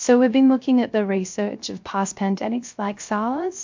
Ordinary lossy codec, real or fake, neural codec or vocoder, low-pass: MP3, 48 kbps; fake; codec, 16 kHz, 0.3 kbps, FocalCodec; 7.2 kHz